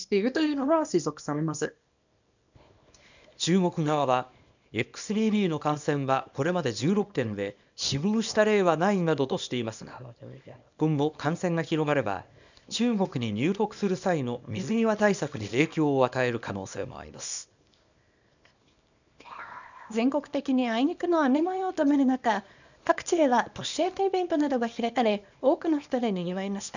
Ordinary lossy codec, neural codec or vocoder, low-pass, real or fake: none; codec, 24 kHz, 0.9 kbps, WavTokenizer, small release; 7.2 kHz; fake